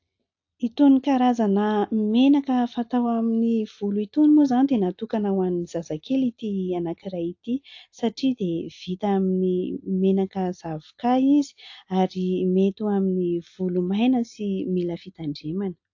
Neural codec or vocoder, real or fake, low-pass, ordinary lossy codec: none; real; 7.2 kHz; AAC, 48 kbps